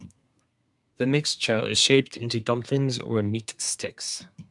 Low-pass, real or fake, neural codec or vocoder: 10.8 kHz; fake; codec, 24 kHz, 1 kbps, SNAC